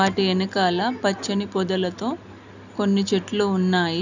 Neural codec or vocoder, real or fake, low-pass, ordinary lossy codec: none; real; 7.2 kHz; none